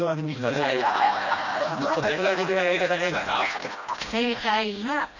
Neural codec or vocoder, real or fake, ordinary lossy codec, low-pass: codec, 16 kHz, 1 kbps, FreqCodec, smaller model; fake; none; 7.2 kHz